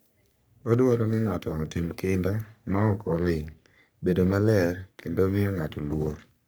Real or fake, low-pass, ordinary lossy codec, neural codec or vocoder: fake; none; none; codec, 44.1 kHz, 3.4 kbps, Pupu-Codec